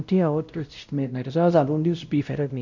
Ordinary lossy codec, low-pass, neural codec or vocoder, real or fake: none; 7.2 kHz; codec, 16 kHz, 0.5 kbps, X-Codec, WavLM features, trained on Multilingual LibriSpeech; fake